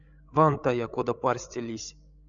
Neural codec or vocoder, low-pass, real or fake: codec, 16 kHz, 16 kbps, FreqCodec, larger model; 7.2 kHz; fake